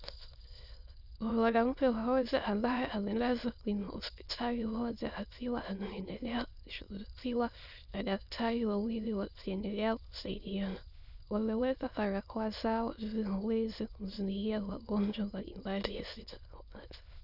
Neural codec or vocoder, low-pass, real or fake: autoencoder, 22.05 kHz, a latent of 192 numbers a frame, VITS, trained on many speakers; 5.4 kHz; fake